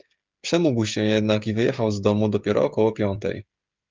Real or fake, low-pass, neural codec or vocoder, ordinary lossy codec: fake; 7.2 kHz; codec, 16 kHz, 16 kbps, FreqCodec, smaller model; Opus, 32 kbps